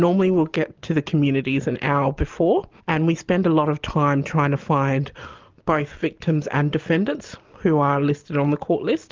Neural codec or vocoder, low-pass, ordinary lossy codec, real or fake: none; 7.2 kHz; Opus, 24 kbps; real